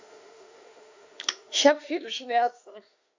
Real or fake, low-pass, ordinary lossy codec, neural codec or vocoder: fake; 7.2 kHz; AAC, 48 kbps; autoencoder, 48 kHz, 32 numbers a frame, DAC-VAE, trained on Japanese speech